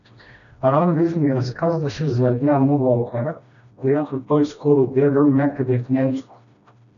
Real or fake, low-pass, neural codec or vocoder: fake; 7.2 kHz; codec, 16 kHz, 1 kbps, FreqCodec, smaller model